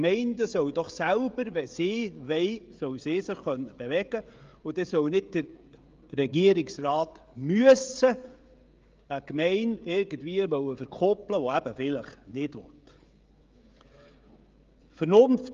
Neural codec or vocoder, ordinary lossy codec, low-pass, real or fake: codec, 16 kHz, 16 kbps, FreqCodec, smaller model; Opus, 32 kbps; 7.2 kHz; fake